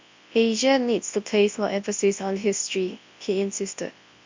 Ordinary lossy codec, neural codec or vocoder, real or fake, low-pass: MP3, 48 kbps; codec, 24 kHz, 0.9 kbps, WavTokenizer, large speech release; fake; 7.2 kHz